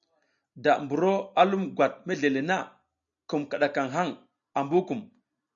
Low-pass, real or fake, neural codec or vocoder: 7.2 kHz; real; none